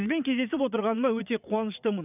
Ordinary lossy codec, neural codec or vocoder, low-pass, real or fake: none; vocoder, 22.05 kHz, 80 mel bands, WaveNeXt; 3.6 kHz; fake